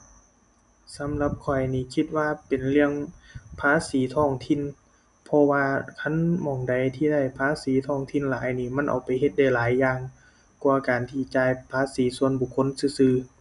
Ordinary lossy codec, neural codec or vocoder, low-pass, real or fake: none; none; 10.8 kHz; real